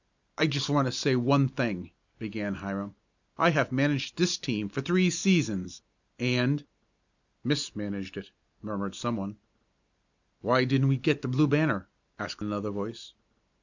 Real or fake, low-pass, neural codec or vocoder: real; 7.2 kHz; none